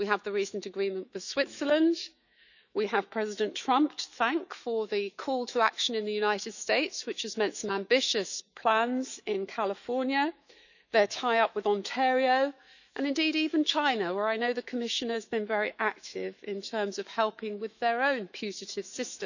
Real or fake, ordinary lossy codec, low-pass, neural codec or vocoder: fake; none; 7.2 kHz; autoencoder, 48 kHz, 128 numbers a frame, DAC-VAE, trained on Japanese speech